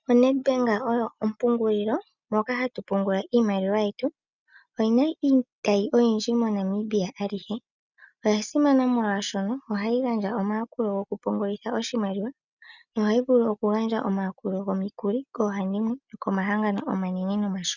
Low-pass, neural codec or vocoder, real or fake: 7.2 kHz; none; real